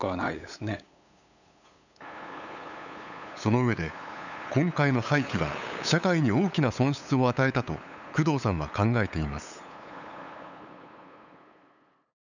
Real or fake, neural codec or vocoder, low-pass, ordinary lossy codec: fake; codec, 16 kHz, 8 kbps, FunCodec, trained on LibriTTS, 25 frames a second; 7.2 kHz; none